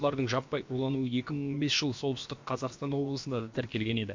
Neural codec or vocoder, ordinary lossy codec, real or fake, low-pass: codec, 16 kHz, about 1 kbps, DyCAST, with the encoder's durations; MP3, 48 kbps; fake; 7.2 kHz